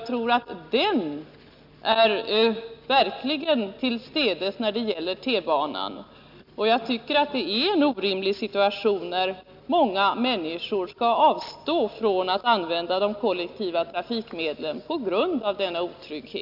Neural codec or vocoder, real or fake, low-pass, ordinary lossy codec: none; real; 5.4 kHz; none